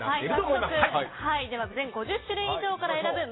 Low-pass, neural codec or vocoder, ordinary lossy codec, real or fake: 7.2 kHz; none; AAC, 16 kbps; real